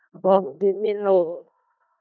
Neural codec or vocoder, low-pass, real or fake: codec, 16 kHz in and 24 kHz out, 0.4 kbps, LongCat-Audio-Codec, four codebook decoder; 7.2 kHz; fake